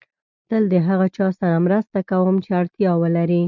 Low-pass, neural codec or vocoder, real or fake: 7.2 kHz; none; real